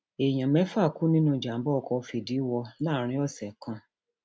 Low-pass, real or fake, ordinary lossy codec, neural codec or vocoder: none; real; none; none